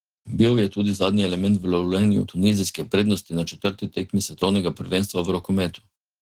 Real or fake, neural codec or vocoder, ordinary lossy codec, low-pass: fake; autoencoder, 48 kHz, 128 numbers a frame, DAC-VAE, trained on Japanese speech; Opus, 16 kbps; 19.8 kHz